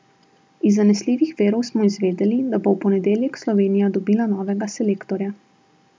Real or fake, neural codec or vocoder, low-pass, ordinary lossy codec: real; none; none; none